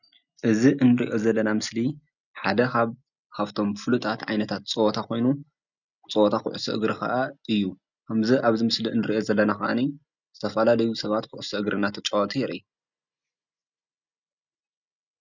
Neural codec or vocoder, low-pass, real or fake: none; 7.2 kHz; real